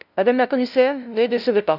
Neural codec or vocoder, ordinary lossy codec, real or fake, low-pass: codec, 16 kHz, 0.5 kbps, FunCodec, trained on LibriTTS, 25 frames a second; none; fake; 5.4 kHz